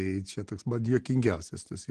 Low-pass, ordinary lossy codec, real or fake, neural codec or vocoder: 9.9 kHz; Opus, 16 kbps; real; none